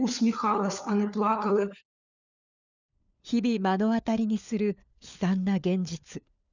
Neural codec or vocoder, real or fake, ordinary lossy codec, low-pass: codec, 16 kHz, 4 kbps, FunCodec, trained on LibriTTS, 50 frames a second; fake; none; 7.2 kHz